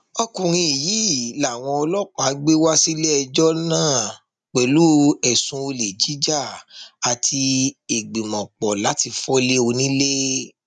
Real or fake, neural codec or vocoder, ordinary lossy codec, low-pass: real; none; none; 10.8 kHz